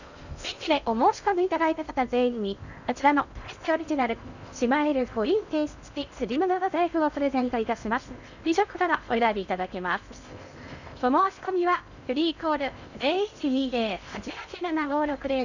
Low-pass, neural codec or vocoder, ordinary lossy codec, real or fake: 7.2 kHz; codec, 16 kHz in and 24 kHz out, 0.6 kbps, FocalCodec, streaming, 2048 codes; none; fake